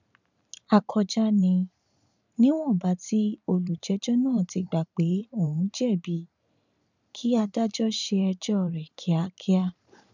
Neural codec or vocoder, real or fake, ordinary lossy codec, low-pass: vocoder, 44.1 kHz, 128 mel bands every 512 samples, BigVGAN v2; fake; none; 7.2 kHz